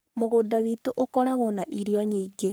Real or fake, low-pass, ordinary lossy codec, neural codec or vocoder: fake; none; none; codec, 44.1 kHz, 3.4 kbps, Pupu-Codec